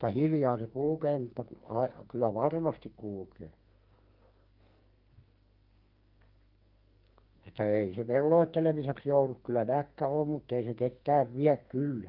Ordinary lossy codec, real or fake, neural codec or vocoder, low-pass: Opus, 24 kbps; fake; codec, 44.1 kHz, 2.6 kbps, SNAC; 5.4 kHz